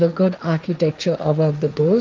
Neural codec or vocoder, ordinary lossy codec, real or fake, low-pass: codec, 16 kHz, 1.1 kbps, Voila-Tokenizer; Opus, 24 kbps; fake; 7.2 kHz